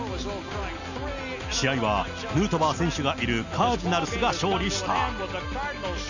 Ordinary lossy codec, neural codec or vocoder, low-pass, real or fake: none; none; 7.2 kHz; real